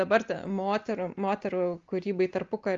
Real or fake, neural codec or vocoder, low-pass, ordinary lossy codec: real; none; 7.2 kHz; Opus, 32 kbps